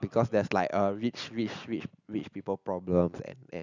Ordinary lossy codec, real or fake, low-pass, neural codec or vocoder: none; real; 7.2 kHz; none